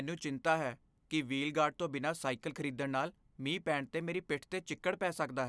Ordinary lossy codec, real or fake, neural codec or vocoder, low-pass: none; real; none; none